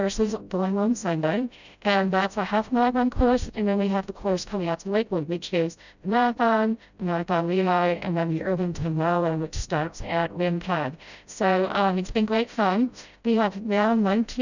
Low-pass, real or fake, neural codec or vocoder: 7.2 kHz; fake; codec, 16 kHz, 0.5 kbps, FreqCodec, smaller model